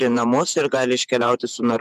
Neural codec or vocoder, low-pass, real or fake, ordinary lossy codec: vocoder, 48 kHz, 128 mel bands, Vocos; 14.4 kHz; fake; MP3, 96 kbps